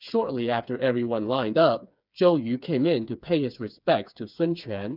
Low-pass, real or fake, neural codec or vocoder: 5.4 kHz; fake; codec, 16 kHz, 4 kbps, FreqCodec, smaller model